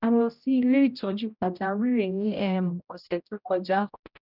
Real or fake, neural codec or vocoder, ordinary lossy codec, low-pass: fake; codec, 16 kHz, 0.5 kbps, X-Codec, HuBERT features, trained on general audio; none; 5.4 kHz